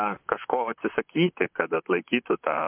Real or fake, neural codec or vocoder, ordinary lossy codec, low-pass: fake; vocoder, 44.1 kHz, 128 mel bands, Pupu-Vocoder; MP3, 32 kbps; 3.6 kHz